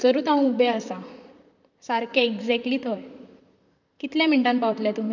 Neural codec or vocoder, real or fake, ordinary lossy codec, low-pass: vocoder, 44.1 kHz, 128 mel bands, Pupu-Vocoder; fake; none; 7.2 kHz